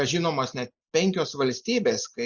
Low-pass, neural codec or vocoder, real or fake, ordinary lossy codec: 7.2 kHz; none; real; Opus, 64 kbps